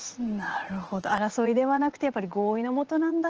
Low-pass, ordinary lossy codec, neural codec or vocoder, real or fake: 7.2 kHz; Opus, 16 kbps; vocoder, 44.1 kHz, 80 mel bands, Vocos; fake